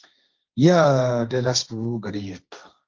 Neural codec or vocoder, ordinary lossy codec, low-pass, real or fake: codec, 16 kHz, 1.1 kbps, Voila-Tokenizer; Opus, 32 kbps; 7.2 kHz; fake